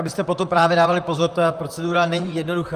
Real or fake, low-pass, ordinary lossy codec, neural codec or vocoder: fake; 14.4 kHz; Opus, 32 kbps; vocoder, 44.1 kHz, 128 mel bands, Pupu-Vocoder